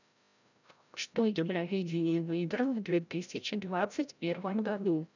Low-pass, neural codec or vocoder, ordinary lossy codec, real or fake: 7.2 kHz; codec, 16 kHz, 0.5 kbps, FreqCodec, larger model; none; fake